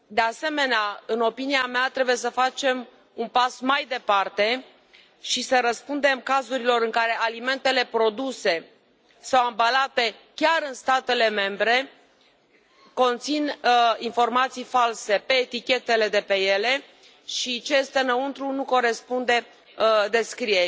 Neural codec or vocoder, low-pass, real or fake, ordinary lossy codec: none; none; real; none